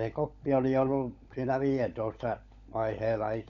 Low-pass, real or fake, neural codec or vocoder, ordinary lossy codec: 7.2 kHz; fake; codec, 16 kHz, 16 kbps, FunCodec, trained on LibriTTS, 50 frames a second; none